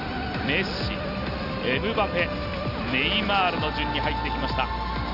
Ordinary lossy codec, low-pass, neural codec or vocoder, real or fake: none; 5.4 kHz; none; real